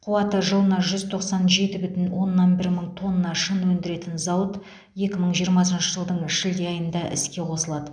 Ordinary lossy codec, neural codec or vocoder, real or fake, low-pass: none; none; real; 9.9 kHz